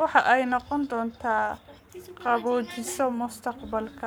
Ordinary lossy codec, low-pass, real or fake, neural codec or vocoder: none; none; fake; codec, 44.1 kHz, 7.8 kbps, Pupu-Codec